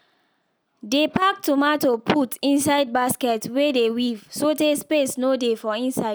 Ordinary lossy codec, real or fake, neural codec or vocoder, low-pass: none; real; none; none